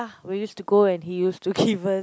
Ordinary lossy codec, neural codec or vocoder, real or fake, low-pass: none; none; real; none